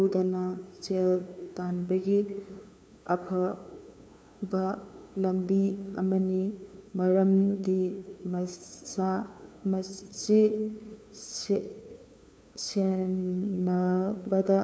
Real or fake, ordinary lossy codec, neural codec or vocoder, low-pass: fake; none; codec, 16 kHz, 2 kbps, FunCodec, trained on LibriTTS, 25 frames a second; none